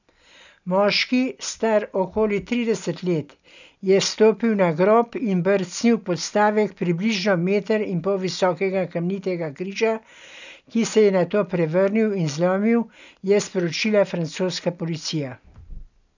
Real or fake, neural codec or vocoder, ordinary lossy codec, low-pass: real; none; none; 7.2 kHz